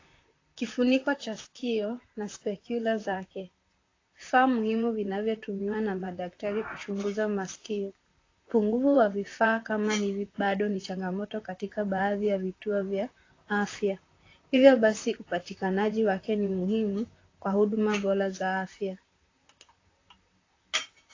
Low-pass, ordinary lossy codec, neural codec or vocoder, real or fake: 7.2 kHz; AAC, 32 kbps; vocoder, 44.1 kHz, 128 mel bands, Pupu-Vocoder; fake